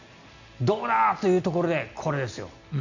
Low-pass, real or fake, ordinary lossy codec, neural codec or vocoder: 7.2 kHz; real; none; none